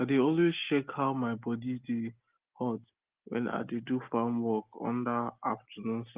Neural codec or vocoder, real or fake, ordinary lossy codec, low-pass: none; real; Opus, 32 kbps; 3.6 kHz